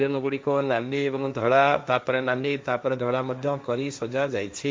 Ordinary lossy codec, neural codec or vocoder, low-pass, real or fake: none; codec, 16 kHz, 1.1 kbps, Voila-Tokenizer; none; fake